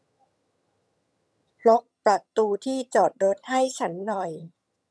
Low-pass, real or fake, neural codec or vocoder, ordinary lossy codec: none; fake; vocoder, 22.05 kHz, 80 mel bands, HiFi-GAN; none